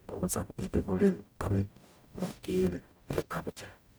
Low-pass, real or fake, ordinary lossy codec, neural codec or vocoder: none; fake; none; codec, 44.1 kHz, 0.9 kbps, DAC